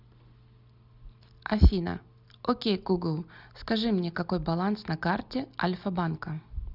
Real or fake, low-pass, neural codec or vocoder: real; 5.4 kHz; none